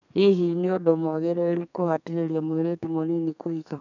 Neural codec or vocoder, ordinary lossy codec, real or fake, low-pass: codec, 32 kHz, 1.9 kbps, SNAC; none; fake; 7.2 kHz